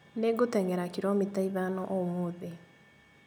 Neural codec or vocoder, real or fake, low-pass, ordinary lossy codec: none; real; none; none